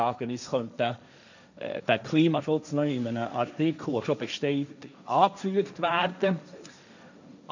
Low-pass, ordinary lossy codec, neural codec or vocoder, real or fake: 7.2 kHz; none; codec, 16 kHz, 1.1 kbps, Voila-Tokenizer; fake